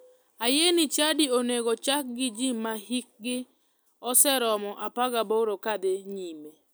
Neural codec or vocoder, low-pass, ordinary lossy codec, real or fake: none; none; none; real